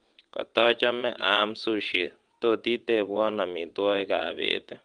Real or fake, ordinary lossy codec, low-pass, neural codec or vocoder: fake; Opus, 32 kbps; 9.9 kHz; vocoder, 22.05 kHz, 80 mel bands, WaveNeXt